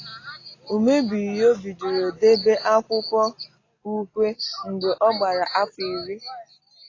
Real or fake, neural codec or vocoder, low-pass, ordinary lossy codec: real; none; 7.2 kHz; AAC, 32 kbps